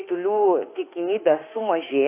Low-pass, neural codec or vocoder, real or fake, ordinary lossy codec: 3.6 kHz; autoencoder, 48 kHz, 32 numbers a frame, DAC-VAE, trained on Japanese speech; fake; AAC, 24 kbps